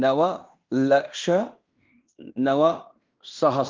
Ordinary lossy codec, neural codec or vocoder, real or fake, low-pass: Opus, 32 kbps; codec, 16 kHz in and 24 kHz out, 0.9 kbps, LongCat-Audio-Codec, fine tuned four codebook decoder; fake; 7.2 kHz